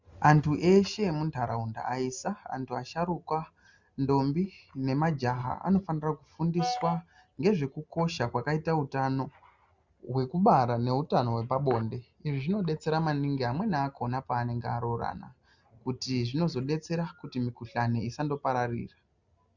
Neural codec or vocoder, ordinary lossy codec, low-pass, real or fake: none; Opus, 64 kbps; 7.2 kHz; real